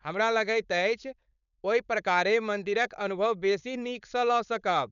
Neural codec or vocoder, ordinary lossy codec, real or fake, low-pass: codec, 16 kHz, 4.8 kbps, FACodec; none; fake; 7.2 kHz